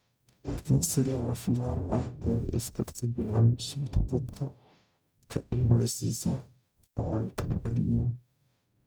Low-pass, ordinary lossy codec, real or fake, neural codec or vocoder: none; none; fake; codec, 44.1 kHz, 0.9 kbps, DAC